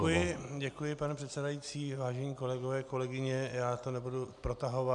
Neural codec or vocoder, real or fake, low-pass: none; real; 10.8 kHz